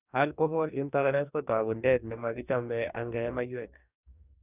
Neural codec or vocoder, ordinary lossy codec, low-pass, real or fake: codec, 44.1 kHz, 2.6 kbps, DAC; none; 3.6 kHz; fake